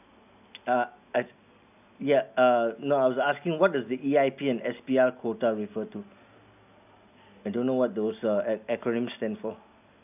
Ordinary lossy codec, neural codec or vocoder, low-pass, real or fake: none; none; 3.6 kHz; real